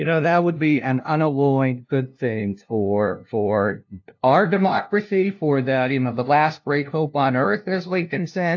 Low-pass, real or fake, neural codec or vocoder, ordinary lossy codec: 7.2 kHz; fake; codec, 16 kHz, 0.5 kbps, FunCodec, trained on LibriTTS, 25 frames a second; AAC, 48 kbps